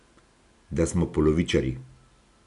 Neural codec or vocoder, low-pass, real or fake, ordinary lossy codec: none; 10.8 kHz; real; none